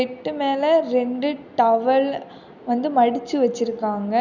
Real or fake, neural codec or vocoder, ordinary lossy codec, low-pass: real; none; none; 7.2 kHz